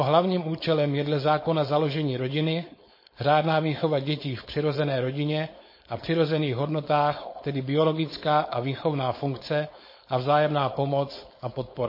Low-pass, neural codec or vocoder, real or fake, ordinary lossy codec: 5.4 kHz; codec, 16 kHz, 4.8 kbps, FACodec; fake; MP3, 24 kbps